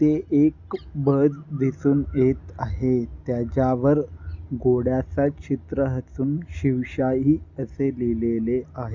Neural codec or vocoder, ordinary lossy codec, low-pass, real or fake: none; Opus, 64 kbps; 7.2 kHz; real